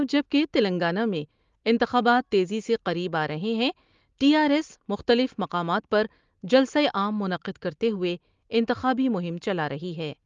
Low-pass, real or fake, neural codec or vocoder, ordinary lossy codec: 7.2 kHz; real; none; Opus, 32 kbps